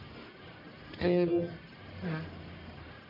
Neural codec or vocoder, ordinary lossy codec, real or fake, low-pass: codec, 44.1 kHz, 1.7 kbps, Pupu-Codec; MP3, 32 kbps; fake; 5.4 kHz